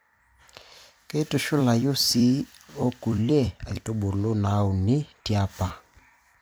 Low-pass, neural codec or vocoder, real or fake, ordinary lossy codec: none; vocoder, 44.1 kHz, 128 mel bands every 256 samples, BigVGAN v2; fake; none